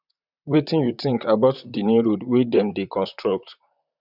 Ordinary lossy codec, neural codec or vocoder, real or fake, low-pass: none; vocoder, 44.1 kHz, 128 mel bands, Pupu-Vocoder; fake; 5.4 kHz